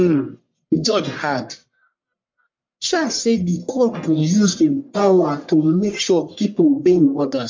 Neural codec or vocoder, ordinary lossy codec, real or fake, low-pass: codec, 44.1 kHz, 1.7 kbps, Pupu-Codec; MP3, 48 kbps; fake; 7.2 kHz